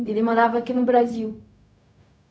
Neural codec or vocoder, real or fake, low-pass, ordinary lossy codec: codec, 16 kHz, 0.4 kbps, LongCat-Audio-Codec; fake; none; none